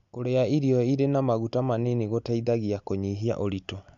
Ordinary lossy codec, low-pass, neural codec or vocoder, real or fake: MP3, 64 kbps; 7.2 kHz; none; real